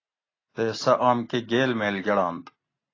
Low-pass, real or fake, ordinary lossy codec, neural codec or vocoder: 7.2 kHz; real; AAC, 32 kbps; none